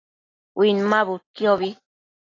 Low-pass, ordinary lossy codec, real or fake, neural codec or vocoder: 7.2 kHz; AAC, 32 kbps; real; none